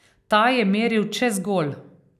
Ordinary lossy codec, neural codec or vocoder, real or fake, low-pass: none; none; real; 14.4 kHz